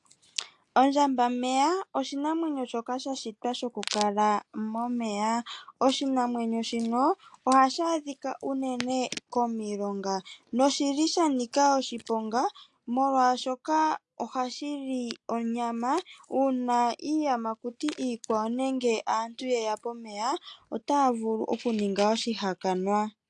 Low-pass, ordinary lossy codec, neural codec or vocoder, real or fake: 10.8 kHz; AAC, 64 kbps; none; real